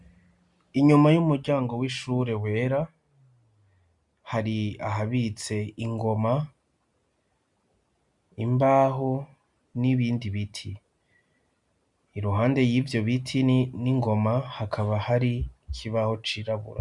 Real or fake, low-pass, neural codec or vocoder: real; 10.8 kHz; none